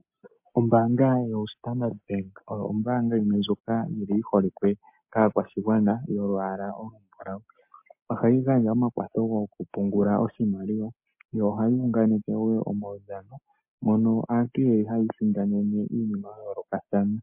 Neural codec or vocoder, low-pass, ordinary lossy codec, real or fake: none; 3.6 kHz; MP3, 32 kbps; real